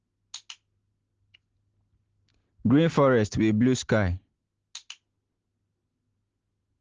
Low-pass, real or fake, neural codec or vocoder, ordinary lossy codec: 7.2 kHz; real; none; Opus, 16 kbps